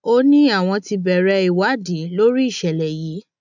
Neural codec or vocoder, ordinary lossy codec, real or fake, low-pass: none; none; real; 7.2 kHz